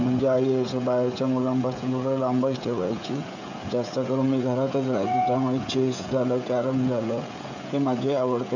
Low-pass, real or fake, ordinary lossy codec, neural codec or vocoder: 7.2 kHz; fake; none; codec, 16 kHz, 16 kbps, FreqCodec, smaller model